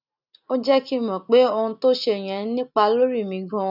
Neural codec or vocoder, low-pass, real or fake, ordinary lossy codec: none; 5.4 kHz; real; none